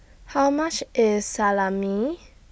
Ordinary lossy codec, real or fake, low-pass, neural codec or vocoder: none; real; none; none